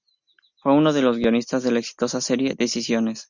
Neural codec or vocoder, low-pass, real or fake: none; 7.2 kHz; real